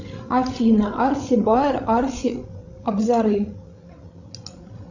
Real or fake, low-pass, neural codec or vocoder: fake; 7.2 kHz; codec, 16 kHz, 16 kbps, FreqCodec, larger model